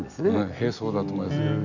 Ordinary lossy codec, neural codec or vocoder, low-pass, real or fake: none; none; 7.2 kHz; real